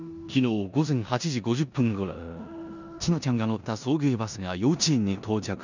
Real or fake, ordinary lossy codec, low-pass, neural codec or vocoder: fake; none; 7.2 kHz; codec, 16 kHz in and 24 kHz out, 0.9 kbps, LongCat-Audio-Codec, four codebook decoder